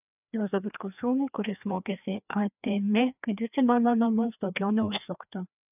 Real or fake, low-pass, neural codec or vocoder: fake; 3.6 kHz; codec, 16 kHz, 2 kbps, FreqCodec, larger model